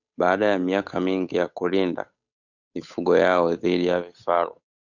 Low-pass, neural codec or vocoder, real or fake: 7.2 kHz; codec, 16 kHz, 8 kbps, FunCodec, trained on Chinese and English, 25 frames a second; fake